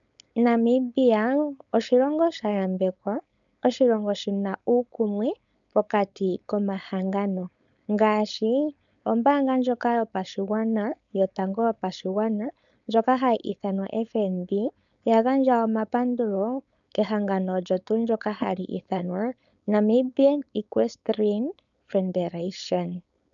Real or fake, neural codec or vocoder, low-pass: fake; codec, 16 kHz, 4.8 kbps, FACodec; 7.2 kHz